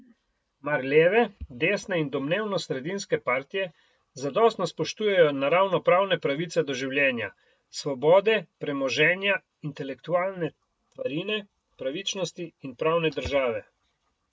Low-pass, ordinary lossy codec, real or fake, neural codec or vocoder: none; none; real; none